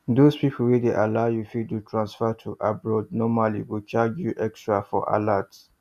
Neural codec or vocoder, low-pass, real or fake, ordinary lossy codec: none; 14.4 kHz; real; none